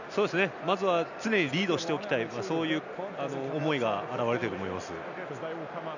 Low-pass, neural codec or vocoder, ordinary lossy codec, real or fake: 7.2 kHz; none; none; real